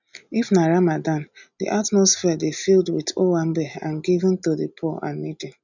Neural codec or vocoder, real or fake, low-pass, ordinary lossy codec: none; real; 7.2 kHz; none